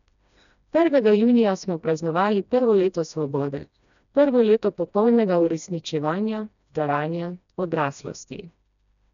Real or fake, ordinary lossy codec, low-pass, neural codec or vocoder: fake; none; 7.2 kHz; codec, 16 kHz, 1 kbps, FreqCodec, smaller model